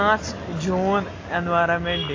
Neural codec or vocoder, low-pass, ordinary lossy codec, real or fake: none; 7.2 kHz; AAC, 32 kbps; real